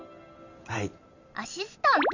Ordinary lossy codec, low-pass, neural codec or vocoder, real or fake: none; 7.2 kHz; none; real